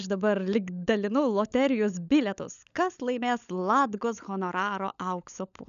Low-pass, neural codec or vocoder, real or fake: 7.2 kHz; codec, 16 kHz, 16 kbps, FunCodec, trained on LibriTTS, 50 frames a second; fake